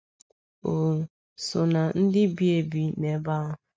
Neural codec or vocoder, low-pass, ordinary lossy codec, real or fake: none; none; none; real